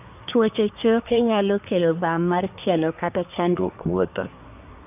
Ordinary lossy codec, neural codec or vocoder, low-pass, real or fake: none; codec, 24 kHz, 1 kbps, SNAC; 3.6 kHz; fake